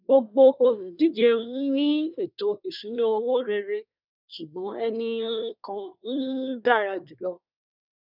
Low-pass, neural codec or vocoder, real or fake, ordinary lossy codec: 5.4 kHz; codec, 24 kHz, 1 kbps, SNAC; fake; none